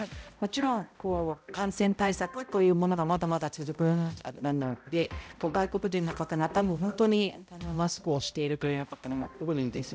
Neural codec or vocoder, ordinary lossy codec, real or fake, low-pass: codec, 16 kHz, 0.5 kbps, X-Codec, HuBERT features, trained on balanced general audio; none; fake; none